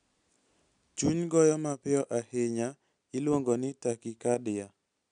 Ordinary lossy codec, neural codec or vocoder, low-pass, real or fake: none; none; 9.9 kHz; real